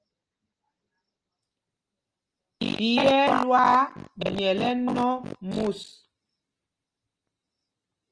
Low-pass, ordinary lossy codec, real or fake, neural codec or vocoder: 9.9 kHz; Opus, 24 kbps; real; none